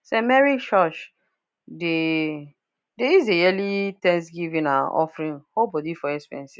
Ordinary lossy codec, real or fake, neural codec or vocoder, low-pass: none; real; none; none